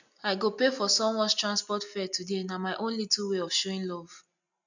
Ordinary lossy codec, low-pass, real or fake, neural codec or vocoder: none; 7.2 kHz; real; none